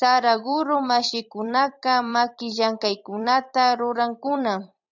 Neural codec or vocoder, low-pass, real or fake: vocoder, 44.1 kHz, 128 mel bands every 256 samples, BigVGAN v2; 7.2 kHz; fake